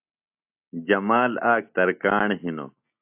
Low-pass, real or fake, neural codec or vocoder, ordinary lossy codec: 3.6 kHz; real; none; AAC, 32 kbps